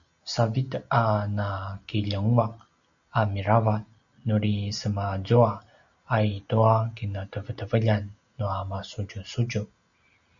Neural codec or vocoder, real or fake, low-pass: none; real; 7.2 kHz